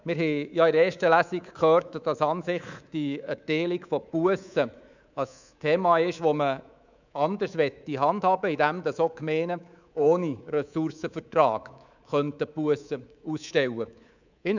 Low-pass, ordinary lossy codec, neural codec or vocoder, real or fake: 7.2 kHz; none; codec, 24 kHz, 3.1 kbps, DualCodec; fake